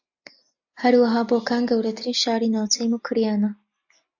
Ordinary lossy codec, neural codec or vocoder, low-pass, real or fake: AAC, 48 kbps; none; 7.2 kHz; real